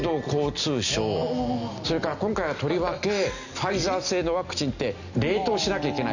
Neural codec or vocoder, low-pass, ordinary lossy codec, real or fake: none; 7.2 kHz; none; real